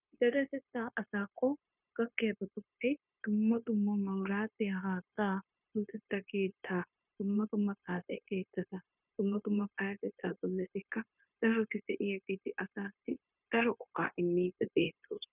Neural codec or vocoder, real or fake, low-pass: codec, 16 kHz, 0.9 kbps, LongCat-Audio-Codec; fake; 3.6 kHz